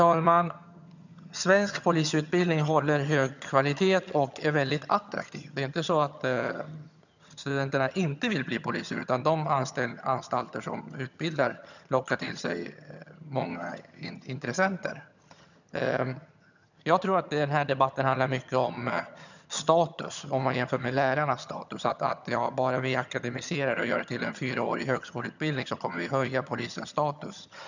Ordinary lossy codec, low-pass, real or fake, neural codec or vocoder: none; 7.2 kHz; fake; vocoder, 22.05 kHz, 80 mel bands, HiFi-GAN